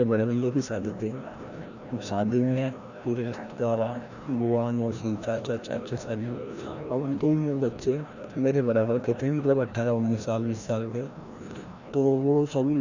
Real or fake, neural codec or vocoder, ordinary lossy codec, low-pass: fake; codec, 16 kHz, 1 kbps, FreqCodec, larger model; none; 7.2 kHz